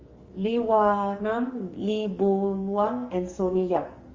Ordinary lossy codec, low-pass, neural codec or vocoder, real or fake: Opus, 32 kbps; 7.2 kHz; codec, 44.1 kHz, 2.6 kbps, SNAC; fake